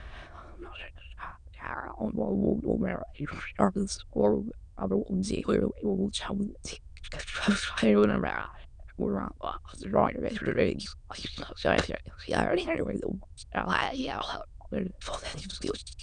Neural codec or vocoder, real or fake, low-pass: autoencoder, 22.05 kHz, a latent of 192 numbers a frame, VITS, trained on many speakers; fake; 9.9 kHz